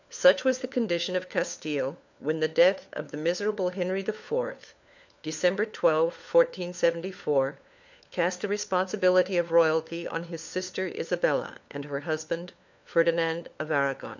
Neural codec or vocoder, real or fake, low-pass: codec, 16 kHz, 4 kbps, FunCodec, trained on LibriTTS, 50 frames a second; fake; 7.2 kHz